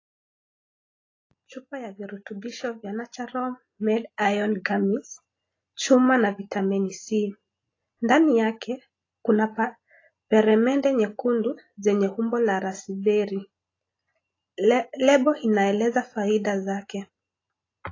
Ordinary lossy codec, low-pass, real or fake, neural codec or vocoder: AAC, 32 kbps; 7.2 kHz; real; none